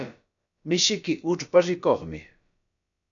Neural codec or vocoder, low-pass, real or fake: codec, 16 kHz, about 1 kbps, DyCAST, with the encoder's durations; 7.2 kHz; fake